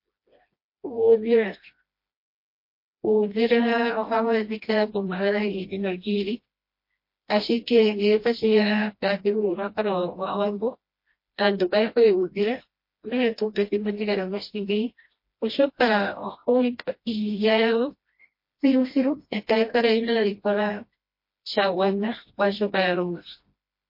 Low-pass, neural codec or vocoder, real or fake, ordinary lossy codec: 5.4 kHz; codec, 16 kHz, 1 kbps, FreqCodec, smaller model; fake; MP3, 32 kbps